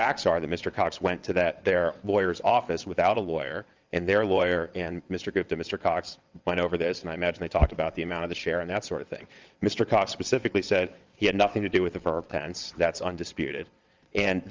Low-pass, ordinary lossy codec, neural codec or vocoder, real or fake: 7.2 kHz; Opus, 24 kbps; vocoder, 22.05 kHz, 80 mel bands, WaveNeXt; fake